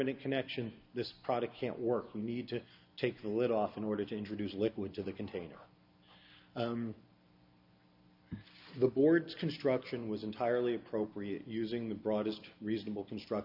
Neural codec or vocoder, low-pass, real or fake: none; 5.4 kHz; real